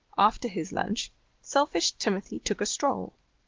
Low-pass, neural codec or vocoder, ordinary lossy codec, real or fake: 7.2 kHz; none; Opus, 16 kbps; real